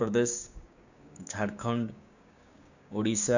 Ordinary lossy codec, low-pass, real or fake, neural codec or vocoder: none; 7.2 kHz; fake; codec, 16 kHz, 6 kbps, DAC